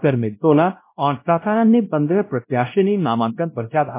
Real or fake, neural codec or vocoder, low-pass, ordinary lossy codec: fake; codec, 16 kHz, 0.5 kbps, X-Codec, WavLM features, trained on Multilingual LibriSpeech; 3.6 kHz; MP3, 24 kbps